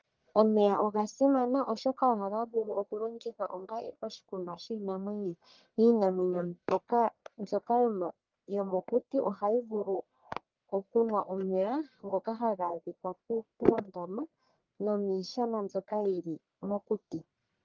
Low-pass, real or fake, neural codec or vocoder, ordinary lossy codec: 7.2 kHz; fake; codec, 44.1 kHz, 1.7 kbps, Pupu-Codec; Opus, 32 kbps